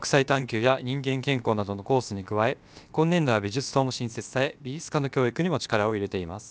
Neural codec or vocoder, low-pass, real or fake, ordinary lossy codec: codec, 16 kHz, about 1 kbps, DyCAST, with the encoder's durations; none; fake; none